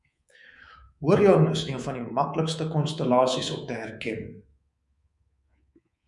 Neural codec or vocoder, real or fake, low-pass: codec, 24 kHz, 3.1 kbps, DualCodec; fake; 10.8 kHz